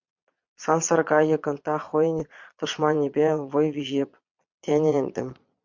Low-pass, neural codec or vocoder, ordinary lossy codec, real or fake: 7.2 kHz; vocoder, 22.05 kHz, 80 mel bands, Vocos; MP3, 64 kbps; fake